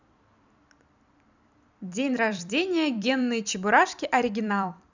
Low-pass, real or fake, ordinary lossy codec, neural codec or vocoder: 7.2 kHz; fake; none; vocoder, 44.1 kHz, 128 mel bands every 256 samples, BigVGAN v2